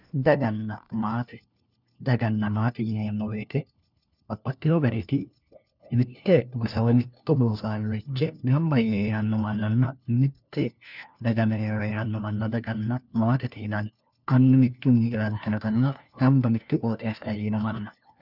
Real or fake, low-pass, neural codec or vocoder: fake; 5.4 kHz; codec, 16 kHz, 1 kbps, FunCodec, trained on LibriTTS, 50 frames a second